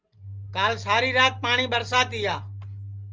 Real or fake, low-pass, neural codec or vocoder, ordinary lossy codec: real; 7.2 kHz; none; Opus, 24 kbps